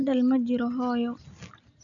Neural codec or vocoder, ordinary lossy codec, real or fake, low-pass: none; none; real; 7.2 kHz